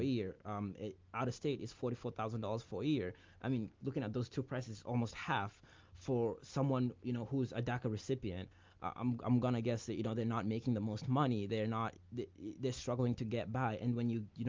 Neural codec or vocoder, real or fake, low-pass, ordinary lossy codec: none; real; 7.2 kHz; Opus, 24 kbps